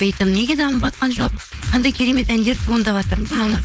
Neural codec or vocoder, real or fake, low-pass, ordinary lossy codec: codec, 16 kHz, 4.8 kbps, FACodec; fake; none; none